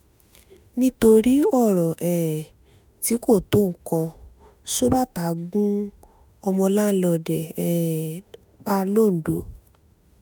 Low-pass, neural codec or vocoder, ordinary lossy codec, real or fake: none; autoencoder, 48 kHz, 32 numbers a frame, DAC-VAE, trained on Japanese speech; none; fake